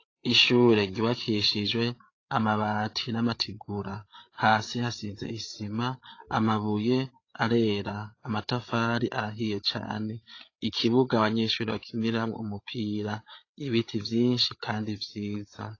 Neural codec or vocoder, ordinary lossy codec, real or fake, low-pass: codec, 16 kHz, 8 kbps, FreqCodec, larger model; AAC, 32 kbps; fake; 7.2 kHz